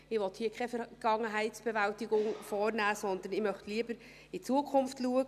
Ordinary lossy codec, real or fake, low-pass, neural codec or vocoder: none; real; 14.4 kHz; none